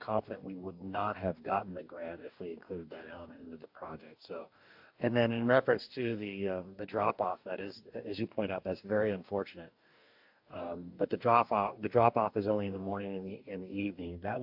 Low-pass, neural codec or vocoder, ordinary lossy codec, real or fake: 5.4 kHz; codec, 44.1 kHz, 2.6 kbps, DAC; AAC, 48 kbps; fake